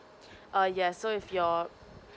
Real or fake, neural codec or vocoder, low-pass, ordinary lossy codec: real; none; none; none